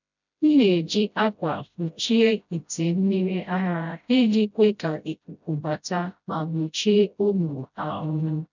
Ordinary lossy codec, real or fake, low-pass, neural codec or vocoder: none; fake; 7.2 kHz; codec, 16 kHz, 0.5 kbps, FreqCodec, smaller model